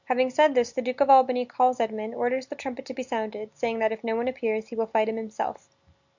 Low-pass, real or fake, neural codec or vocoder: 7.2 kHz; real; none